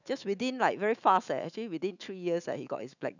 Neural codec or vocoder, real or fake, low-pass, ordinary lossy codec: autoencoder, 48 kHz, 128 numbers a frame, DAC-VAE, trained on Japanese speech; fake; 7.2 kHz; none